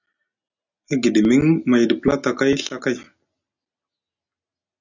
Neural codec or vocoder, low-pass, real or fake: none; 7.2 kHz; real